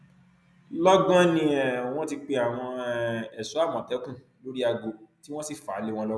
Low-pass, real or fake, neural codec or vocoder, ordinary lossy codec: none; real; none; none